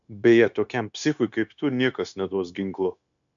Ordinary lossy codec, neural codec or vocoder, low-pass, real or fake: MP3, 96 kbps; codec, 16 kHz, 0.9 kbps, LongCat-Audio-Codec; 7.2 kHz; fake